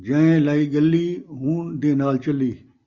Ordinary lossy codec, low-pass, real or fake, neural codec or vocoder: Opus, 64 kbps; 7.2 kHz; real; none